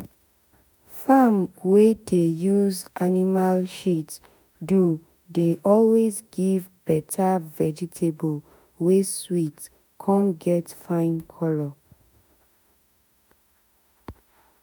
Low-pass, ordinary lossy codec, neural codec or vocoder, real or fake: none; none; autoencoder, 48 kHz, 32 numbers a frame, DAC-VAE, trained on Japanese speech; fake